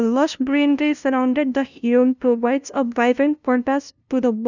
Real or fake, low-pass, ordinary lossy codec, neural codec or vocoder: fake; 7.2 kHz; none; codec, 16 kHz, 0.5 kbps, FunCodec, trained on LibriTTS, 25 frames a second